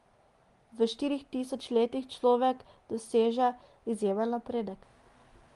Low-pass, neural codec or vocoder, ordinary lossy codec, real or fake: 10.8 kHz; none; Opus, 24 kbps; real